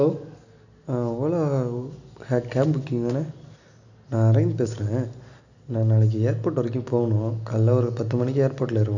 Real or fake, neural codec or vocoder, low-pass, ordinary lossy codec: real; none; 7.2 kHz; none